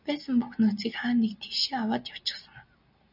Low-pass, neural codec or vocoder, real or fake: 5.4 kHz; none; real